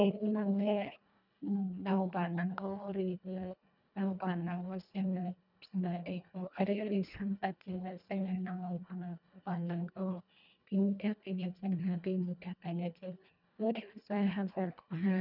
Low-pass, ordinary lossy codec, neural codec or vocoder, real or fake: 5.4 kHz; none; codec, 24 kHz, 1.5 kbps, HILCodec; fake